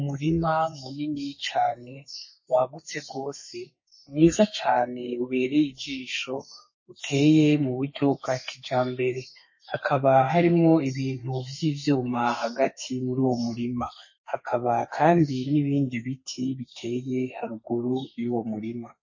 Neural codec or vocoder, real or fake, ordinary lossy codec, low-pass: codec, 32 kHz, 1.9 kbps, SNAC; fake; MP3, 32 kbps; 7.2 kHz